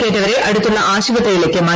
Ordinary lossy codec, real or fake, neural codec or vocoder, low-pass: none; real; none; none